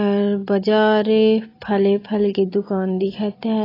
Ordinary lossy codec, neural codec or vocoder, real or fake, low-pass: AAC, 24 kbps; none; real; 5.4 kHz